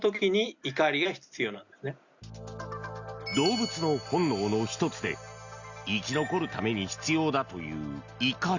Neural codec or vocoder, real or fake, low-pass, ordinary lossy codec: none; real; 7.2 kHz; Opus, 64 kbps